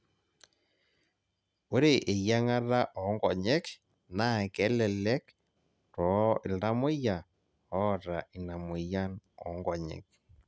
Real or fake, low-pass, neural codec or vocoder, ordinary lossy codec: real; none; none; none